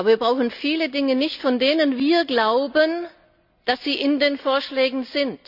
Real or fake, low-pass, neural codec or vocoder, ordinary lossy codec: real; 5.4 kHz; none; none